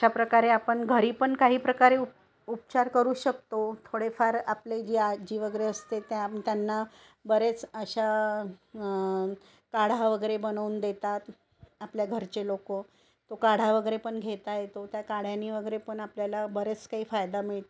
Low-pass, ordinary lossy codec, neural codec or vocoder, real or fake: none; none; none; real